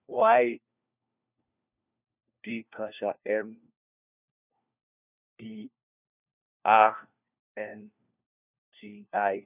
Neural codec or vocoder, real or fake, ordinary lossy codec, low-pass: codec, 16 kHz, 1 kbps, FunCodec, trained on LibriTTS, 50 frames a second; fake; none; 3.6 kHz